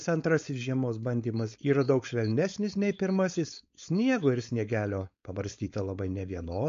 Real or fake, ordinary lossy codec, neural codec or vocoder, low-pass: fake; MP3, 48 kbps; codec, 16 kHz, 4.8 kbps, FACodec; 7.2 kHz